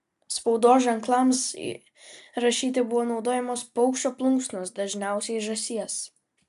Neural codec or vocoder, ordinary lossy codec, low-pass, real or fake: none; AAC, 96 kbps; 14.4 kHz; real